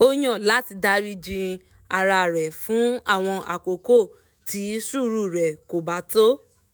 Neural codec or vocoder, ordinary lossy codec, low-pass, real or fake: autoencoder, 48 kHz, 128 numbers a frame, DAC-VAE, trained on Japanese speech; none; none; fake